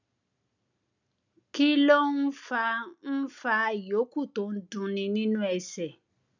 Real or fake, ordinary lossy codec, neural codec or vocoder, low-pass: real; none; none; 7.2 kHz